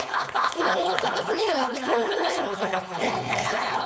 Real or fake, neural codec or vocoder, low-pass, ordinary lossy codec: fake; codec, 16 kHz, 4.8 kbps, FACodec; none; none